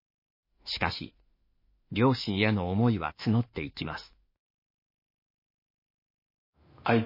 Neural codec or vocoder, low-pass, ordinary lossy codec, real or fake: autoencoder, 48 kHz, 32 numbers a frame, DAC-VAE, trained on Japanese speech; 5.4 kHz; MP3, 24 kbps; fake